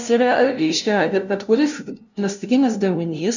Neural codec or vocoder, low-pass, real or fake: codec, 16 kHz, 0.5 kbps, FunCodec, trained on LibriTTS, 25 frames a second; 7.2 kHz; fake